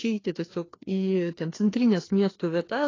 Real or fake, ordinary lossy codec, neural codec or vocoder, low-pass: fake; AAC, 32 kbps; codec, 16 kHz, 2 kbps, FreqCodec, larger model; 7.2 kHz